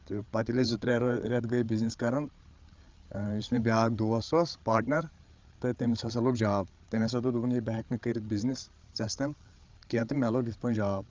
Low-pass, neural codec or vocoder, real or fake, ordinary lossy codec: 7.2 kHz; codec, 16 kHz, 8 kbps, FreqCodec, larger model; fake; Opus, 32 kbps